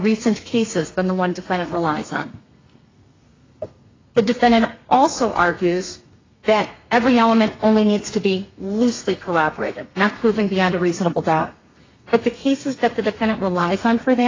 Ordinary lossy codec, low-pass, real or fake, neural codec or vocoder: AAC, 32 kbps; 7.2 kHz; fake; codec, 32 kHz, 1.9 kbps, SNAC